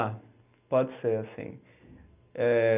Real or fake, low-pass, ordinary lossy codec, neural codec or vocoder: real; 3.6 kHz; none; none